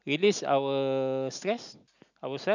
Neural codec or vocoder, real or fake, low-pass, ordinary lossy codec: none; real; 7.2 kHz; none